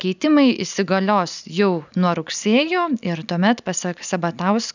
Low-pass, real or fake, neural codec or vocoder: 7.2 kHz; real; none